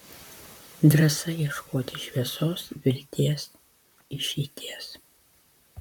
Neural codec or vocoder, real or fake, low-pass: vocoder, 44.1 kHz, 128 mel bands, Pupu-Vocoder; fake; 19.8 kHz